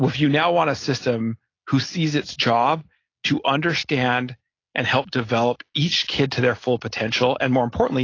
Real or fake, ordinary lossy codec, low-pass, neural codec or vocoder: real; AAC, 32 kbps; 7.2 kHz; none